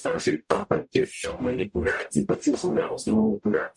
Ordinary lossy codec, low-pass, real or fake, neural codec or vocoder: MP3, 96 kbps; 10.8 kHz; fake; codec, 44.1 kHz, 0.9 kbps, DAC